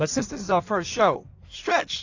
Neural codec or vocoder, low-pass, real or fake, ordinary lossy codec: codec, 16 kHz in and 24 kHz out, 1.1 kbps, FireRedTTS-2 codec; 7.2 kHz; fake; AAC, 48 kbps